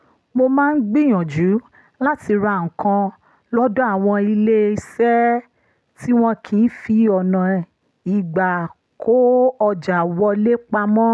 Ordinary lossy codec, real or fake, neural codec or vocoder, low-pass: none; real; none; none